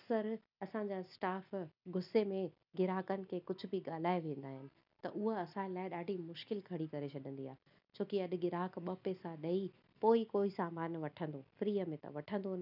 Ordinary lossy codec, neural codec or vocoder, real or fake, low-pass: none; none; real; 5.4 kHz